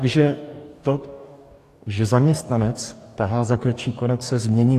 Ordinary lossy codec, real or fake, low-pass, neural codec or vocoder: AAC, 64 kbps; fake; 14.4 kHz; codec, 44.1 kHz, 2.6 kbps, DAC